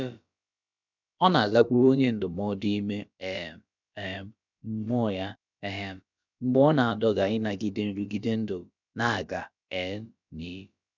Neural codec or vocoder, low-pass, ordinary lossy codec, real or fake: codec, 16 kHz, about 1 kbps, DyCAST, with the encoder's durations; 7.2 kHz; none; fake